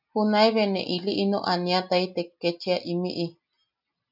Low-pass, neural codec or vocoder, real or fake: 5.4 kHz; none; real